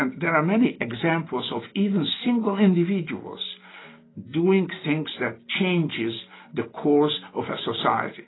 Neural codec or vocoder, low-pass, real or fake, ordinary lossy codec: none; 7.2 kHz; real; AAC, 16 kbps